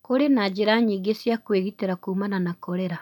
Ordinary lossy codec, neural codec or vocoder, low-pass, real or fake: none; none; 19.8 kHz; real